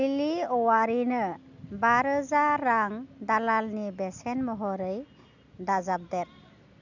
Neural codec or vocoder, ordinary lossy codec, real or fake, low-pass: none; none; real; 7.2 kHz